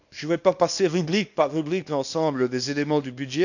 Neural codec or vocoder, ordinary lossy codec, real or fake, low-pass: codec, 24 kHz, 0.9 kbps, WavTokenizer, small release; none; fake; 7.2 kHz